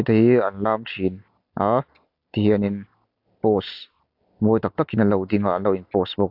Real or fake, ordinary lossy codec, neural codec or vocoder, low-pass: real; AAC, 48 kbps; none; 5.4 kHz